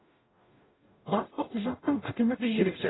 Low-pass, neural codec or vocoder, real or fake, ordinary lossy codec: 7.2 kHz; codec, 44.1 kHz, 0.9 kbps, DAC; fake; AAC, 16 kbps